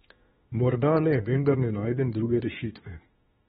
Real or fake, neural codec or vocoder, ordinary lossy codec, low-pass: fake; autoencoder, 48 kHz, 32 numbers a frame, DAC-VAE, trained on Japanese speech; AAC, 16 kbps; 19.8 kHz